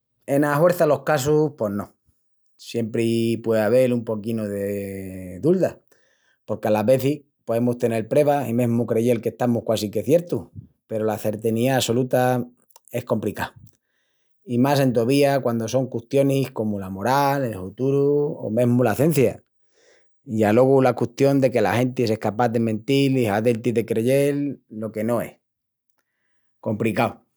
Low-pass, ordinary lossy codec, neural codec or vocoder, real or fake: none; none; none; real